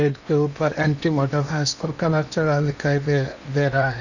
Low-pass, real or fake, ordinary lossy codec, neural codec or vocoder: 7.2 kHz; fake; none; codec, 16 kHz in and 24 kHz out, 0.8 kbps, FocalCodec, streaming, 65536 codes